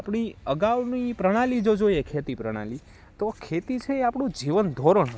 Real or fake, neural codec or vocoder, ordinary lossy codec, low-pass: real; none; none; none